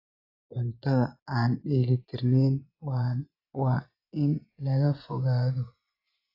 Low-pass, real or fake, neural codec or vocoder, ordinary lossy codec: 5.4 kHz; fake; vocoder, 24 kHz, 100 mel bands, Vocos; AAC, 32 kbps